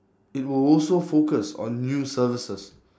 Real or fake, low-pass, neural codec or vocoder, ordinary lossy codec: real; none; none; none